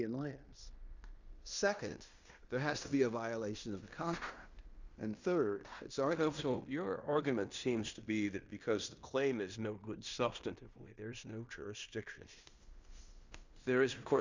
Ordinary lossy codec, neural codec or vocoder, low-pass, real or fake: Opus, 64 kbps; codec, 16 kHz in and 24 kHz out, 0.9 kbps, LongCat-Audio-Codec, fine tuned four codebook decoder; 7.2 kHz; fake